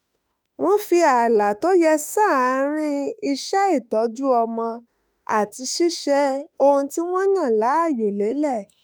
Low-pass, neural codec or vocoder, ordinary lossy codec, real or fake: none; autoencoder, 48 kHz, 32 numbers a frame, DAC-VAE, trained on Japanese speech; none; fake